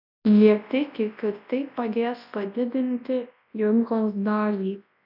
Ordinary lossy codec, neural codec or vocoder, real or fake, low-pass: AAC, 48 kbps; codec, 24 kHz, 0.9 kbps, WavTokenizer, large speech release; fake; 5.4 kHz